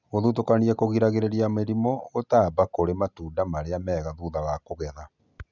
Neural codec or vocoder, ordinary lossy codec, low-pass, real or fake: none; none; 7.2 kHz; real